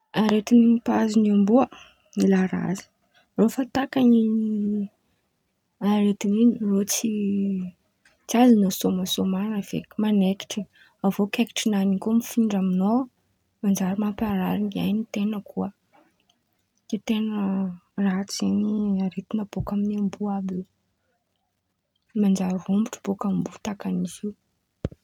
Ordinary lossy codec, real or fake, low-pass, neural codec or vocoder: none; real; 19.8 kHz; none